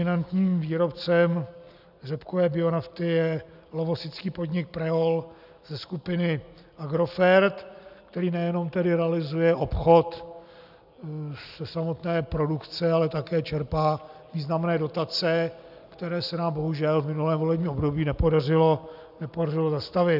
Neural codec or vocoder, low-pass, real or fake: none; 5.4 kHz; real